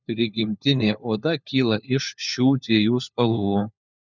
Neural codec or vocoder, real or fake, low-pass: codec, 16 kHz, 4 kbps, FunCodec, trained on LibriTTS, 50 frames a second; fake; 7.2 kHz